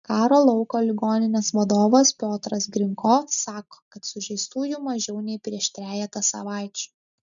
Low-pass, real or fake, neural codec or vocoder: 7.2 kHz; real; none